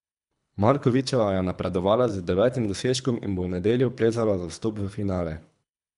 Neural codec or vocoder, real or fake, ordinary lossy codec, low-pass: codec, 24 kHz, 3 kbps, HILCodec; fake; none; 10.8 kHz